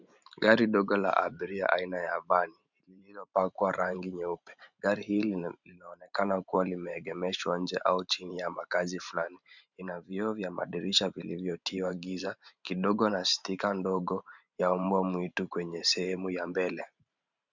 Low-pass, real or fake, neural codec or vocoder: 7.2 kHz; fake; vocoder, 44.1 kHz, 128 mel bands every 512 samples, BigVGAN v2